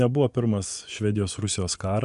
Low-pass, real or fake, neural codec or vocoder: 10.8 kHz; fake; vocoder, 24 kHz, 100 mel bands, Vocos